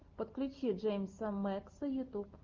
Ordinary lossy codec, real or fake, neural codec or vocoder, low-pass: Opus, 32 kbps; real; none; 7.2 kHz